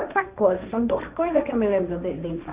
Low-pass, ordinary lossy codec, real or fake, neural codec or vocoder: 3.6 kHz; Opus, 64 kbps; fake; codec, 16 kHz, 1.1 kbps, Voila-Tokenizer